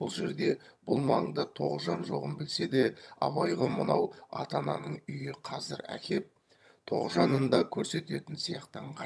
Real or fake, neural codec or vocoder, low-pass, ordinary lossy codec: fake; vocoder, 22.05 kHz, 80 mel bands, HiFi-GAN; none; none